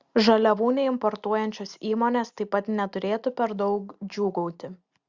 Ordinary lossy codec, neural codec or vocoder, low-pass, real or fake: Opus, 64 kbps; none; 7.2 kHz; real